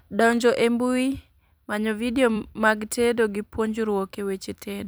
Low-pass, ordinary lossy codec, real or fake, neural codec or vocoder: none; none; fake; vocoder, 44.1 kHz, 128 mel bands every 256 samples, BigVGAN v2